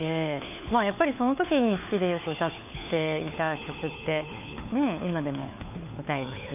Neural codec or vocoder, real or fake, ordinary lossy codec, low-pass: codec, 16 kHz, 4 kbps, FunCodec, trained on LibriTTS, 50 frames a second; fake; none; 3.6 kHz